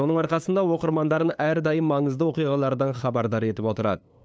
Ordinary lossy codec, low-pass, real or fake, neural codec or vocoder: none; none; fake; codec, 16 kHz, 2 kbps, FunCodec, trained on LibriTTS, 25 frames a second